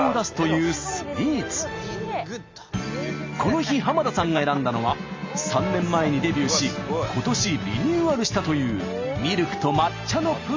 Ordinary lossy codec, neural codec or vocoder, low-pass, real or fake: MP3, 64 kbps; none; 7.2 kHz; real